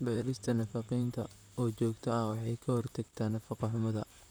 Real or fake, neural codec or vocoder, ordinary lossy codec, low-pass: fake; vocoder, 44.1 kHz, 128 mel bands, Pupu-Vocoder; none; none